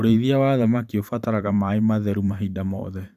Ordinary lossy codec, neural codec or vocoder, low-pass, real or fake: none; vocoder, 44.1 kHz, 128 mel bands every 512 samples, BigVGAN v2; 14.4 kHz; fake